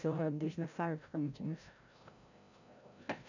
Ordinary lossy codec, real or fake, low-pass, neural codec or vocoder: none; fake; 7.2 kHz; codec, 16 kHz, 0.5 kbps, FreqCodec, larger model